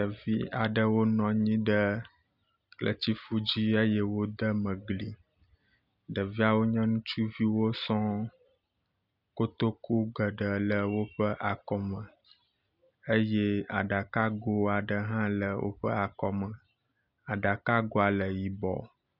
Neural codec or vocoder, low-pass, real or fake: none; 5.4 kHz; real